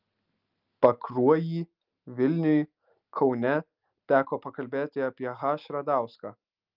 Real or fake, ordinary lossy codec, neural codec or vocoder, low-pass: real; Opus, 24 kbps; none; 5.4 kHz